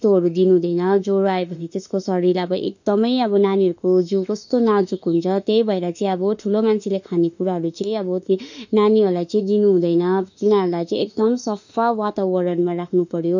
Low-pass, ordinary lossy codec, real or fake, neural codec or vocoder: 7.2 kHz; none; fake; autoencoder, 48 kHz, 32 numbers a frame, DAC-VAE, trained on Japanese speech